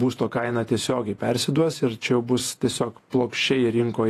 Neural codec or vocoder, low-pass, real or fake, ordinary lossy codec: vocoder, 48 kHz, 128 mel bands, Vocos; 14.4 kHz; fake; AAC, 48 kbps